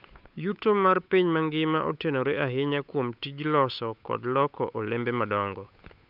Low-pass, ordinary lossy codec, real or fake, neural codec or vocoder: 5.4 kHz; none; fake; codec, 16 kHz, 8 kbps, FunCodec, trained on Chinese and English, 25 frames a second